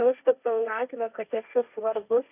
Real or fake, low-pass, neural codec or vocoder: fake; 3.6 kHz; codec, 16 kHz, 1.1 kbps, Voila-Tokenizer